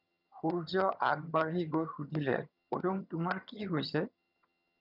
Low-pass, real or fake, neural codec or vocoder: 5.4 kHz; fake; vocoder, 22.05 kHz, 80 mel bands, HiFi-GAN